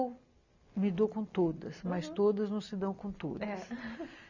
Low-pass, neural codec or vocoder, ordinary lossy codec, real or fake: 7.2 kHz; none; none; real